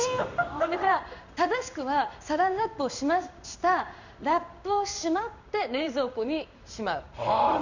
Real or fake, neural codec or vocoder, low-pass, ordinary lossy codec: fake; codec, 16 kHz in and 24 kHz out, 1 kbps, XY-Tokenizer; 7.2 kHz; none